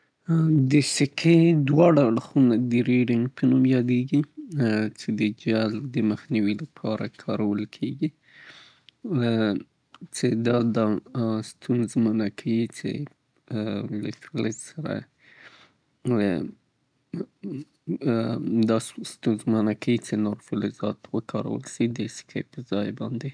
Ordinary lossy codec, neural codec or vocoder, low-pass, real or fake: none; none; 9.9 kHz; real